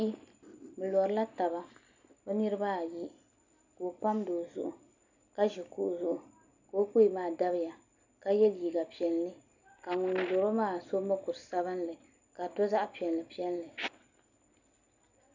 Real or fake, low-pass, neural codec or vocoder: real; 7.2 kHz; none